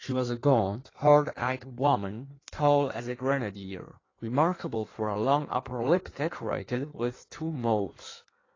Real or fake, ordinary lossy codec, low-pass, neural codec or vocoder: fake; AAC, 32 kbps; 7.2 kHz; codec, 16 kHz in and 24 kHz out, 1.1 kbps, FireRedTTS-2 codec